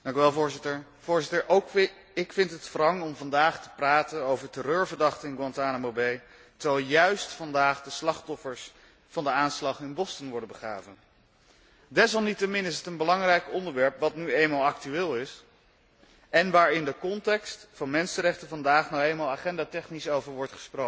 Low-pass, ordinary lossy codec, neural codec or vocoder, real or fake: none; none; none; real